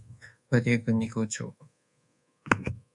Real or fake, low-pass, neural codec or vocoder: fake; 10.8 kHz; codec, 24 kHz, 1.2 kbps, DualCodec